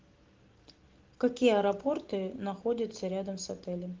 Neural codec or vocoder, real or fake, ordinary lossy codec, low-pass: none; real; Opus, 16 kbps; 7.2 kHz